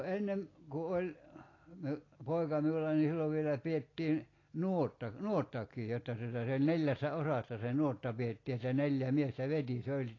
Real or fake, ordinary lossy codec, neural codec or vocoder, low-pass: real; AAC, 32 kbps; none; 7.2 kHz